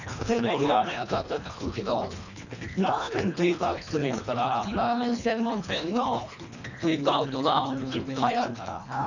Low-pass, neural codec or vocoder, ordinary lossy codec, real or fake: 7.2 kHz; codec, 24 kHz, 1.5 kbps, HILCodec; none; fake